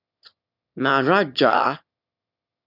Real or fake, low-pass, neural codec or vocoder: fake; 5.4 kHz; autoencoder, 22.05 kHz, a latent of 192 numbers a frame, VITS, trained on one speaker